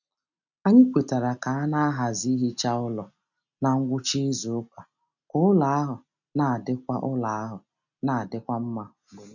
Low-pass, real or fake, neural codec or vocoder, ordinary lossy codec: 7.2 kHz; real; none; none